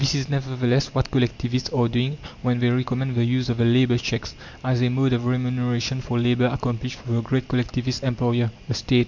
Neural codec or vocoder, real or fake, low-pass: none; real; 7.2 kHz